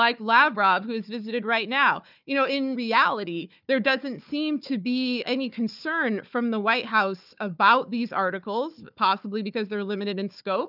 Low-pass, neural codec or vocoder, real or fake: 5.4 kHz; codec, 16 kHz, 4 kbps, FunCodec, trained on Chinese and English, 50 frames a second; fake